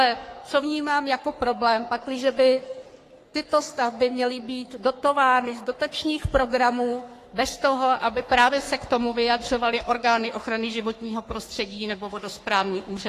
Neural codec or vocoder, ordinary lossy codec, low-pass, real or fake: codec, 44.1 kHz, 3.4 kbps, Pupu-Codec; AAC, 48 kbps; 14.4 kHz; fake